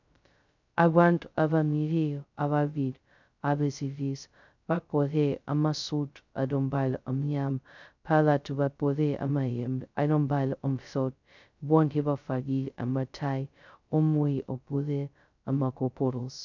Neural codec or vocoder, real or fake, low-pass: codec, 16 kHz, 0.2 kbps, FocalCodec; fake; 7.2 kHz